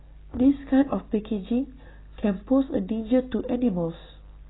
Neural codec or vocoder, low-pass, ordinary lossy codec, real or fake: none; 7.2 kHz; AAC, 16 kbps; real